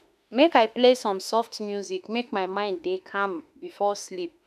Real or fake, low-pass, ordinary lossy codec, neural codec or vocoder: fake; 14.4 kHz; none; autoencoder, 48 kHz, 32 numbers a frame, DAC-VAE, trained on Japanese speech